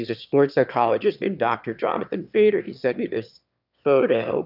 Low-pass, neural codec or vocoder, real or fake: 5.4 kHz; autoencoder, 22.05 kHz, a latent of 192 numbers a frame, VITS, trained on one speaker; fake